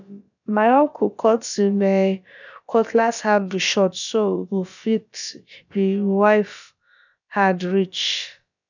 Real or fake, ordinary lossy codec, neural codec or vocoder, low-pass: fake; none; codec, 16 kHz, about 1 kbps, DyCAST, with the encoder's durations; 7.2 kHz